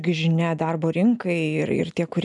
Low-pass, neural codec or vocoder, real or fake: 10.8 kHz; none; real